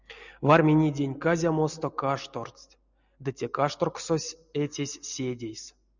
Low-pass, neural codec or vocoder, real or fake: 7.2 kHz; none; real